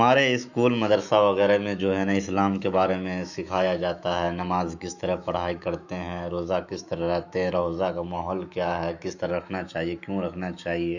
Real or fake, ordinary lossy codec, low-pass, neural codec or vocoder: fake; none; 7.2 kHz; autoencoder, 48 kHz, 128 numbers a frame, DAC-VAE, trained on Japanese speech